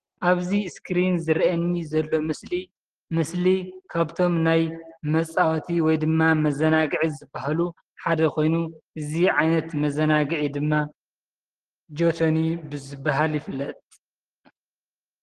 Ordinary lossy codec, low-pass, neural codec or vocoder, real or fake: Opus, 16 kbps; 9.9 kHz; none; real